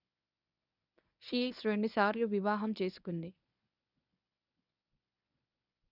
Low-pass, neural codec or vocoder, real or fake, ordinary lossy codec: 5.4 kHz; codec, 24 kHz, 0.9 kbps, WavTokenizer, medium speech release version 1; fake; none